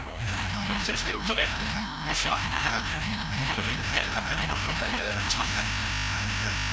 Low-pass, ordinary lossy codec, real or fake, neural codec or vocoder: none; none; fake; codec, 16 kHz, 0.5 kbps, FreqCodec, larger model